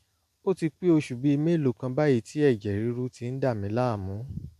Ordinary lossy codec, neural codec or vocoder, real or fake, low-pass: AAC, 96 kbps; none; real; 14.4 kHz